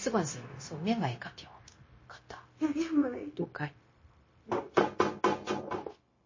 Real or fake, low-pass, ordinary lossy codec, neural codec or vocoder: fake; 7.2 kHz; MP3, 32 kbps; codec, 16 kHz, 0.9 kbps, LongCat-Audio-Codec